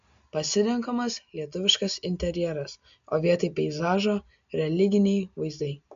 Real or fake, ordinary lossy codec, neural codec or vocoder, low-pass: real; MP3, 64 kbps; none; 7.2 kHz